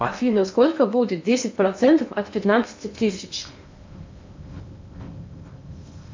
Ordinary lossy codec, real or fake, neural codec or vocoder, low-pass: MP3, 64 kbps; fake; codec, 16 kHz in and 24 kHz out, 0.8 kbps, FocalCodec, streaming, 65536 codes; 7.2 kHz